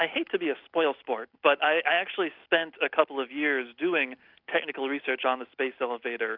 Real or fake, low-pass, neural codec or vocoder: real; 5.4 kHz; none